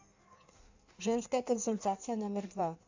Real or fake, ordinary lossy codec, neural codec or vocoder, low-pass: fake; AAC, 48 kbps; codec, 16 kHz in and 24 kHz out, 1.1 kbps, FireRedTTS-2 codec; 7.2 kHz